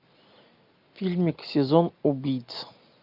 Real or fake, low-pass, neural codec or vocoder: real; 5.4 kHz; none